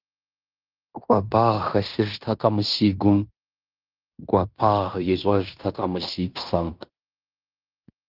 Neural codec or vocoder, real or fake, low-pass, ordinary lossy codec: codec, 16 kHz in and 24 kHz out, 0.9 kbps, LongCat-Audio-Codec, fine tuned four codebook decoder; fake; 5.4 kHz; Opus, 32 kbps